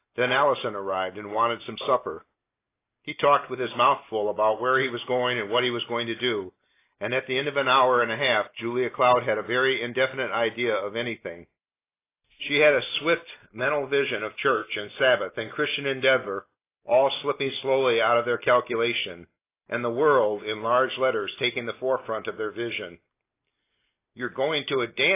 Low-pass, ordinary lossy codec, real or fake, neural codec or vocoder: 3.6 kHz; AAC, 24 kbps; real; none